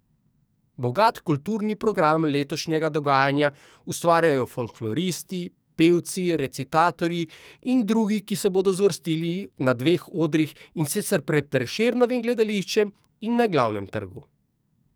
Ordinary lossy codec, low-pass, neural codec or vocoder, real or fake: none; none; codec, 44.1 kHz, 2.6 kbps, SNAC; fake